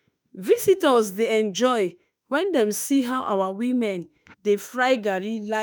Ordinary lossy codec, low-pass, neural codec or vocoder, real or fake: none; none; autoencoder, 48 kHz, 32 numbers a frame, DAC-VAE, trained on Japanese speech; fake